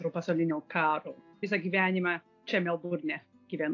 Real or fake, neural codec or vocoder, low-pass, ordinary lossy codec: real; none; 7.2 kHz; AAC, 48 kbps